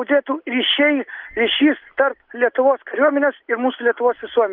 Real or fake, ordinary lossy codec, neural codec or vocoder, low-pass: real; Opus, 32 kbps; none; 5.4 kHz